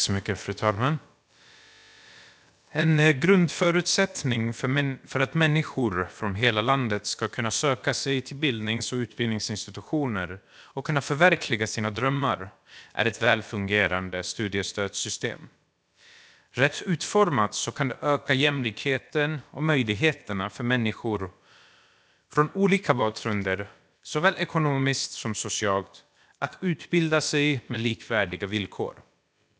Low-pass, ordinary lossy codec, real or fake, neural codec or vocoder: none; none; fake; codec, 16 kHz, about 1 kbps, DyCAST, with the encoder's durations